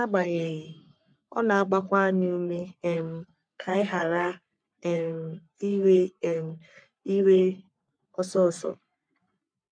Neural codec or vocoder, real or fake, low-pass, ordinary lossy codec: codec, 44.1 kHz, 3.4 kbps, Pupu-Codec; fake; 9.9 kHz; none